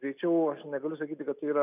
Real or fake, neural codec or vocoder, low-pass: real; none; 3.6 kHz